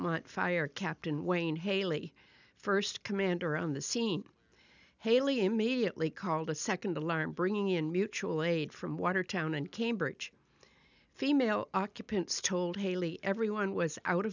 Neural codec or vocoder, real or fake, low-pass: none; real; 7.2 kHz